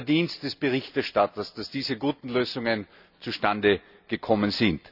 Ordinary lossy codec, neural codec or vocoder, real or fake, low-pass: none; none; real; 5.4 kHz